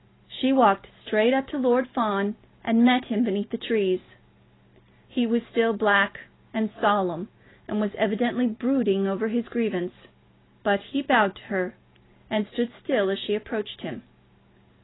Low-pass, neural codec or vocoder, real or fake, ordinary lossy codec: 7.2 kHz; none; real; AAC, 16 kbps